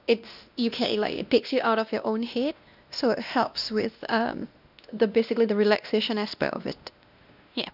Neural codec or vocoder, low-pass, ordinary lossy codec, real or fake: codec, 16 kHz, 1 kbps, X-Codec, WavLM features, trained on Multilingual LibriSpeech; 5.4 kHz; none; fake